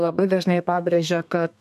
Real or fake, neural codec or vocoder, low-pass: fake; codec, 32 kHz, 1.9 kbps, SNAC; 14.4 kHz